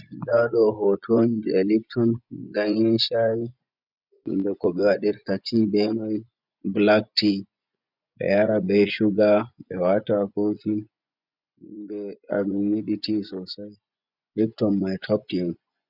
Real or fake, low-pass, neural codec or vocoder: fake; 5.4 kHz; vocoder, 24 kHz, 100 mel bands, Vocos